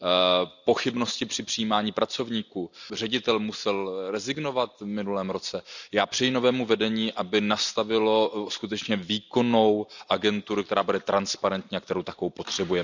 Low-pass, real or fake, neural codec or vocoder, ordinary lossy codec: 7.2 kHz; real; none; none